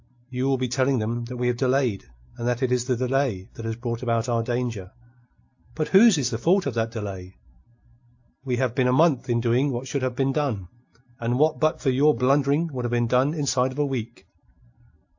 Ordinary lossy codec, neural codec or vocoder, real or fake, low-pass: AAC, 48 kbps; none; real; 7.2 kHz